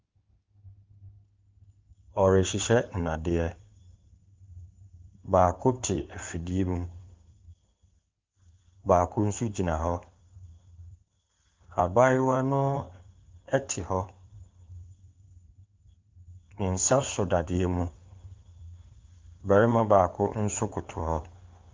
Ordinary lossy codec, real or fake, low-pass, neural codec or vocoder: Opus, 32 kbps; fake; 7.2 kHz; codec, 16 kHz in and 24 kHz out, 2.2 kbps, FireRedTTS-2 codec